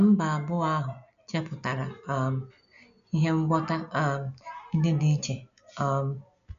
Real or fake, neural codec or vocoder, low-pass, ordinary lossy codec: real; none; 7.2 kHz; MP3, 96 kbps